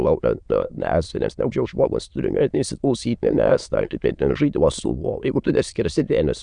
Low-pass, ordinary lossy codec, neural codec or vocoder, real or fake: 9.9 kHz; Opus, 64 kbps; autoencoder, 22.05 kHz, a latent of 192 numbers a frame, VITS, trained on many speakers; fake